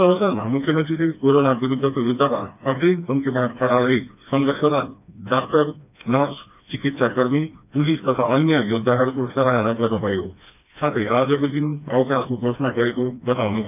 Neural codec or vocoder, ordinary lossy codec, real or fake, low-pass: codec, 16 kHz, 2 kbps, FreqCodec, smaller model; none; fake; 3.6 kHz